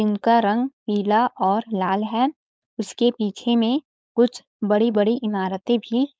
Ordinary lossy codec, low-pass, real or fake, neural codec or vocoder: none; none; fake; codec, 16 kHz, 4.8 kbps, FACodec